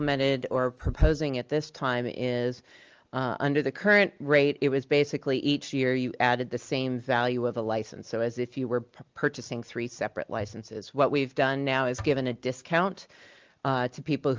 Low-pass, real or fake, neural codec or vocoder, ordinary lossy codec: 7.2 kHz; real; none; Opus, 24 kbps